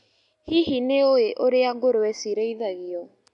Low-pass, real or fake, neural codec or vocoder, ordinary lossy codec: 10.8 kHz; real; none; none